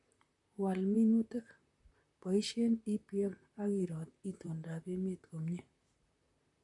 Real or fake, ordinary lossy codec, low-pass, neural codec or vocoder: fake; MP3, 48 kbps; 10.8 kHz; vocoder, 44.1 kHz, 128 mel bands, Pupu-Vocoder